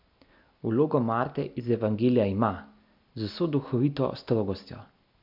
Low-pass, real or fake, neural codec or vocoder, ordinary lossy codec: 5.4 kHz; real; none; MP3, 48 kbps